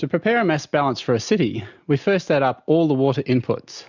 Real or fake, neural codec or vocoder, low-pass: real; none; 7.2 kHz